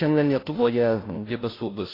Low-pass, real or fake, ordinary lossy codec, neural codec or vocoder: 5.4 kHz; fake; AAC, 24 kbps; codec, 16 kHz, 0.5 kbps, FunCodec, trained on Chinese and English, 25 frames a second